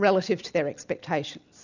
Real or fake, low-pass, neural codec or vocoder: real; 7.2 kHz; none